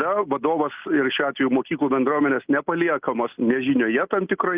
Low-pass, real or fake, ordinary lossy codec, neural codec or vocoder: 3.6 kHz; real; Opus, 32 kbps; none